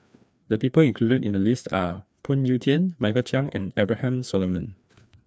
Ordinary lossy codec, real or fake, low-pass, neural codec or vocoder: none; fake; none; codec, 16 kHz, 2 kbps, FreqCodec, larger model